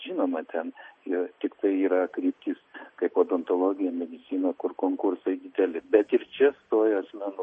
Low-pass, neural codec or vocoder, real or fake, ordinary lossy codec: 10.8 kHz; none; real; MP3, 32 kbps